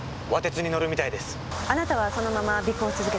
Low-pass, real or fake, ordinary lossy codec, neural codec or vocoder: none; real; none; none